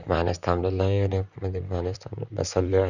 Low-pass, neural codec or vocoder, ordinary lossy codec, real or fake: 7.2 kHz; vocoder, 44.1 kHz, 128 mel bands, Pupu-Vocoder; none; fake